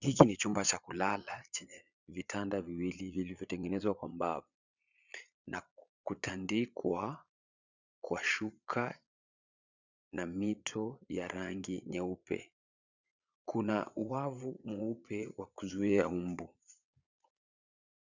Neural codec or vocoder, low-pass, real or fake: vocoder, 22.05 kHz, 80 mel bands, Vocos; 7.2 kHz; fake